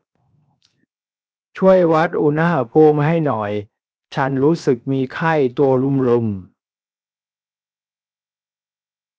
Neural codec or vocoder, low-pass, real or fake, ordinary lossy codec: codec, 16 kHz, 0.7 kbps, FocalCodec; none; fake; none